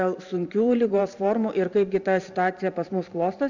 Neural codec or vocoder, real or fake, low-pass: vocoder, 44.1 kHz, 128 mel bands every 512 samples, BigVGAN v2; fake; 7.2 kHz